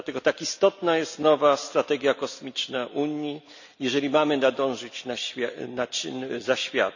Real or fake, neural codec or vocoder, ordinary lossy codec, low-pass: real; none; none; 7.2 kHz